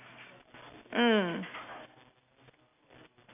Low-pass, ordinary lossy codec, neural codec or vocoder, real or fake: 3.6 kHz; none; none; real